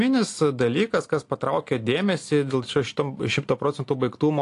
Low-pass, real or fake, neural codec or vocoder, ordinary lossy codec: 10.8 kHz; real; none; AAC, 48 kbps